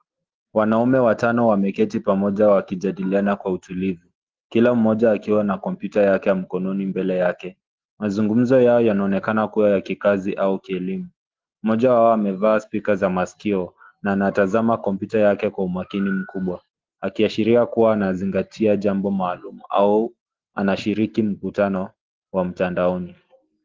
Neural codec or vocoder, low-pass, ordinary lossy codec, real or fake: none; 7.2 kHz; Opus, 16 kbps; real